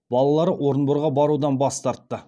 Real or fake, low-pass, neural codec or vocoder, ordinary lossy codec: real; none; none; none